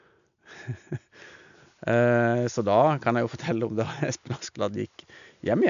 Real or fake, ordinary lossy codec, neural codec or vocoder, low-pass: real; none; none; 7.2 kHz